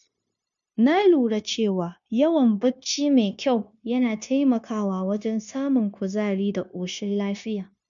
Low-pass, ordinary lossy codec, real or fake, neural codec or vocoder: 7.2 kHz; none; fake; codec, 16 kHz, 0.9 kbps, LongCat-Audio-Codec